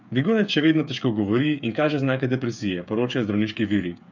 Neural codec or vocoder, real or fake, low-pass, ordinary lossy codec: codec, 16 kHz, 8 kbps, FreqCodec, smaller model; fake; 7.2 kHz; none